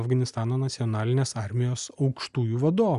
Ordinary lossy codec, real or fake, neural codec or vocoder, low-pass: Opus, 64 kbps; real; none; 10.8 kHz